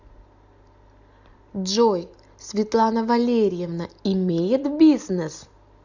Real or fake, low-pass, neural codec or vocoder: real; 7.2 kHz; none